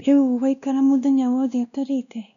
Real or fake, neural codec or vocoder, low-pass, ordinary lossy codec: fake; codec, 16 kHz, 1 kbps, X-Codec, WavLM features, trained on Multilingual LibriSpeech; 7.2 kHz; none